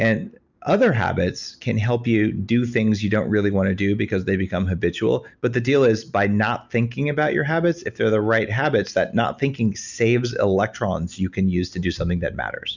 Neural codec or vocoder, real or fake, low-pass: none; real; 7.2 kHz